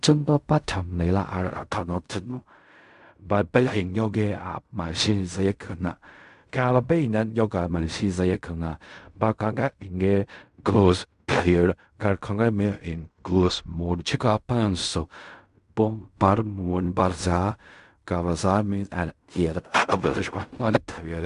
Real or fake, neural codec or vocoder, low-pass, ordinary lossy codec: fake; codec, 16 kHz in and 24 kHz out, 0.4 kbps, LongCat-Audio-Codec, fine tuned four codebook decoder; 10.8 kHz; AAC, 96 kbps